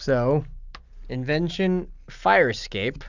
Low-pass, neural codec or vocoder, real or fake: 7.2 kHz; none; real